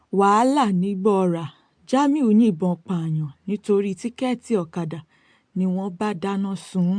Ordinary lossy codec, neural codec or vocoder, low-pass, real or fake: MP3, 64 kbps; none; 9.9 kHz; real